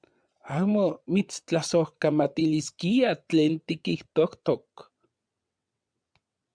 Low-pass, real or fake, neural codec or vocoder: 9.9 kHz; fake; vocoder, 22.05 kHz, 80 mel bands, WaveNeXt